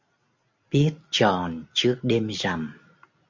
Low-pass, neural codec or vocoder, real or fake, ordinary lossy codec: 7.2 kHz; none; real; MP3, 64 kbps